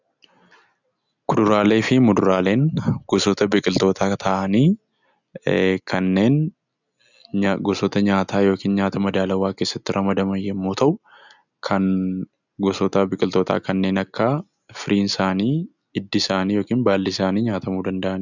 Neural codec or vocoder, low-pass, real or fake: none; 7.2 kHz; real